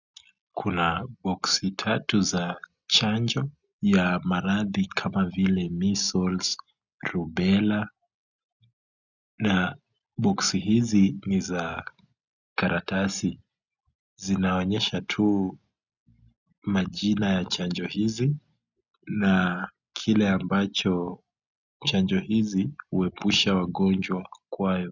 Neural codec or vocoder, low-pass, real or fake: none; 7.2 kHz; real